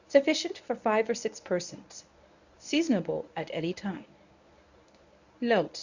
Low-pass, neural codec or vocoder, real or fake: 7.2 kHz; codec, 24 kHz, 0.9 kbps, WavTokenizer, medium speech release version 1; fake